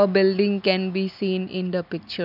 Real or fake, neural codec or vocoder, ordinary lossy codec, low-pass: real; none; none; 5.4 kHz